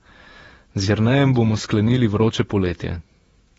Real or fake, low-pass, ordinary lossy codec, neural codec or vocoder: fake; 19.8 kHz; AAC, 24 kbps; vocoder, 48 kHz, 128 mel bands, Vocos